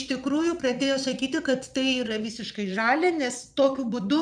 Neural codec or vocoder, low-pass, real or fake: vocoder, 22.05 kHz, 80 mel bands, Vocos; 9.9 kHz; fake